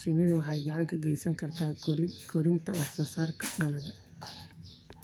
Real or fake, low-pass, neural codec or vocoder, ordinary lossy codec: fake; none; codec, 44.1 kHz, 2.6 kbps, SNAC; none